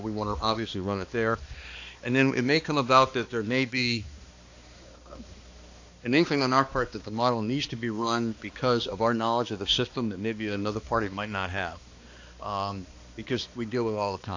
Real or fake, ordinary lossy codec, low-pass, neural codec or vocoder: fake; AAC, 48 kbps; 7.2 kHz; codec, 16 kHz, 2 kbps, X-Codec, HuBERT features, trained on balanced general audio